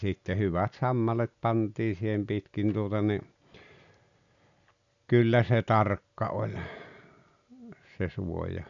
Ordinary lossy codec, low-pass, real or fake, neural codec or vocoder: none; 7.2 kHz; real; none